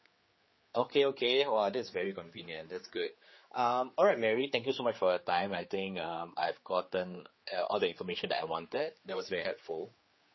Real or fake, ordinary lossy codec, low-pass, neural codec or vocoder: fake; MP3, 24 kbps; 7.2 kHz; codec, 16 kHz, 4 kbps, X-Codec, HuBERT features, trained on general audio